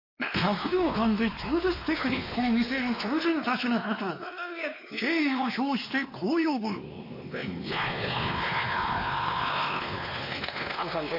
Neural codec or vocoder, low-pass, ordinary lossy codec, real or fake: codec, 16 kHz, 2 kbps, X-Codec, WavLM features, trained on Multilingual LibriSpeech; 5.4 kHz; MP3, 32 kbps; fake